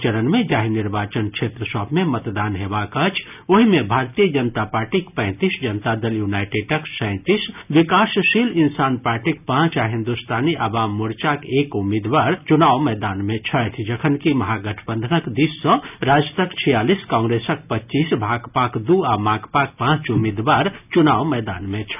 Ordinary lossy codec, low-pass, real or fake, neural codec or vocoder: none; 3.6 kHz; real; none